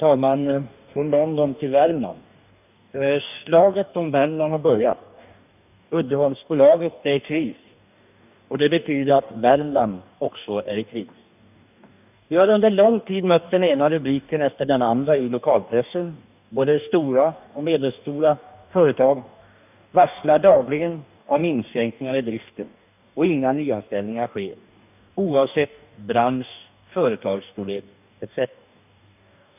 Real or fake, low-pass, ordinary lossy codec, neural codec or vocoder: fake; 3.6 kHz; none; codec, 44.1 kHz, 2.6 kbps, DAC